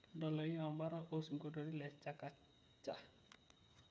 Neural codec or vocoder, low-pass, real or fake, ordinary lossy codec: codec, 16 kHz, 8 kbps, FreqCodec, smaller model; none; fake; none